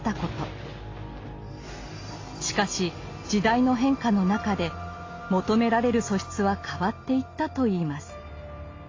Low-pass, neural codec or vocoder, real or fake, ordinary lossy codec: 7.2 kHz; none; real; AAC, 32 kbps